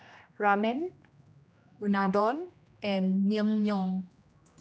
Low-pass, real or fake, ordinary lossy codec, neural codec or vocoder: none; fake; none; codec, 16 kHz, 1 kbps, X-Codec, HuBERT features, trained on general audio